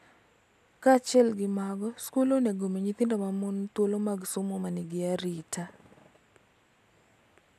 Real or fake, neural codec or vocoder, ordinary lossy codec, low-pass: real; none; none; 14.4 kHz